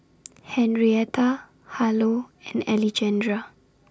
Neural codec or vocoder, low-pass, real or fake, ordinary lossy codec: none; none; real; none